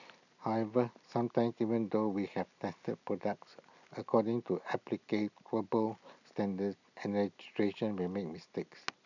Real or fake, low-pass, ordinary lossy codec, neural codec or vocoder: real; 7.2 kHz; none; none